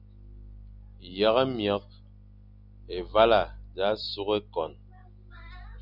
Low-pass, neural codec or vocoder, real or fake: 5.4 kHz; none; real